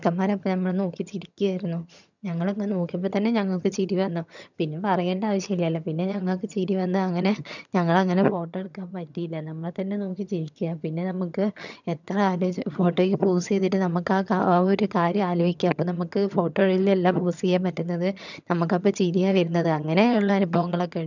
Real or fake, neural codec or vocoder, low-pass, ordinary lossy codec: fake; vocoder, 22.05 kHz, 80 mel bands, HiFi-GAN; 7.2 kHz; none